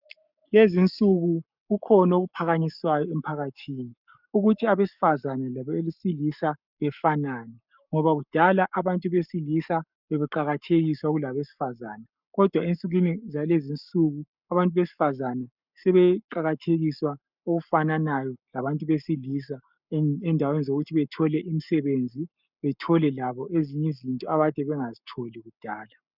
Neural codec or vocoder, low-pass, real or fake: codec, 44.1 kHz, 7.8 kbps, Pupu-Codec; 5.4 kHz; fake